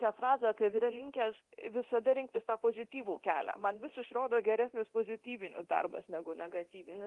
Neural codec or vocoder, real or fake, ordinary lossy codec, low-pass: codec, 24 kHz, 0.9 kbps, DualCodec; fake; Opus, 24 kbps; 10.8 kHz